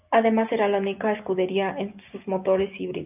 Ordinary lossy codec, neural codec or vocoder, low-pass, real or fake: AAC, 32 kbps; none; 3.6 kHz; real